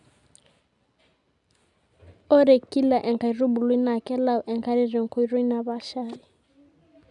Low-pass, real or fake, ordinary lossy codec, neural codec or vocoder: 10.8 kHz; real; none; none